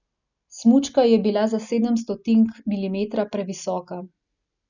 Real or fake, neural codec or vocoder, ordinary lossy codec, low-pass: real; none; none; 7.2 kHz